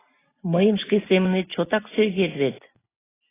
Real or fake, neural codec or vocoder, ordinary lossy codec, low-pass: real; none; AAC, 16 kbps; 3.6 kHz